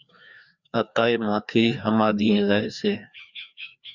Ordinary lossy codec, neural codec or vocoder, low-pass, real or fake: Opus, 64 kbps; codec, 16 kHz, 2 kbps, FreqCodec, larger model; 7.2 kHz; fake